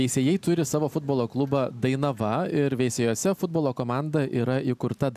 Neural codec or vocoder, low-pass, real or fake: none; 14.4 kHz; real